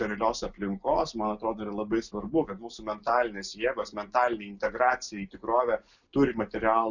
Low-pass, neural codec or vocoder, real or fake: 7.2 kHz; none; real